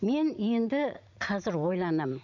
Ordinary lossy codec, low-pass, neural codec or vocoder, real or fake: none; 7.2 kHz; vocoder, 44.1 kHz, 80 mel bands, Vocos; fake